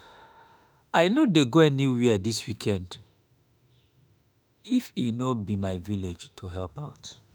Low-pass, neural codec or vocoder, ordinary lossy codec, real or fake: none; autoencoder, 48 kHz, 32 numbers a frame, DAC-VAE, trained on Japanese speech; none; fake